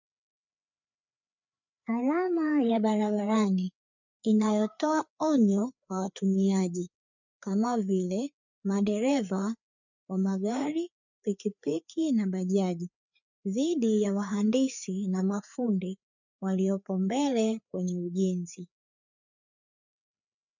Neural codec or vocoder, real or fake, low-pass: codec, 16 kHz, 4 kbps, FreqCodec, larger model; fake; 7.2 kHz